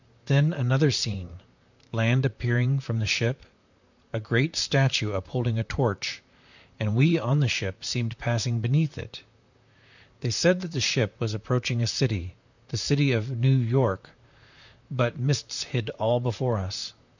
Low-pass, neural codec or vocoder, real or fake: 7.2 kHz; vocoder, 44.1 kHz, 128 mel bands, Pupu-Vocoder; fake